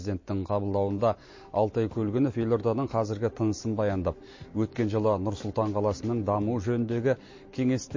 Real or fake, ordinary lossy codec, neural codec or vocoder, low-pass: real; MP3, 32 kbps; none; 7.2 kHz